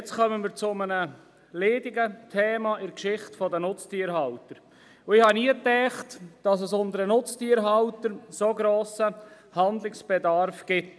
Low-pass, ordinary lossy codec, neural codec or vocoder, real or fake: none; none; none; real